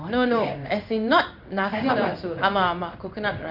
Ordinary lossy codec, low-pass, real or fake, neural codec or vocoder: none; 5.4 kHz; fake; codec, 16 kHz in and 24 kHz out, 1 kbps, XY-Tokenizer